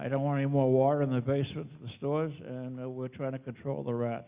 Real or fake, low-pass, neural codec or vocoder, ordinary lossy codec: real; 3.6 kHz; none; Opus, 64 kbps